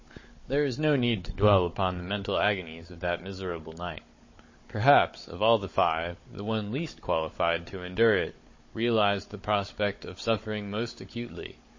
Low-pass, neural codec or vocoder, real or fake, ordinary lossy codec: 7.2 kHz; codec, 16 kHz, 16 kbps, FunCodec, trained on Chinese and English, 50 frames a second; fake; MP3, 32 kbps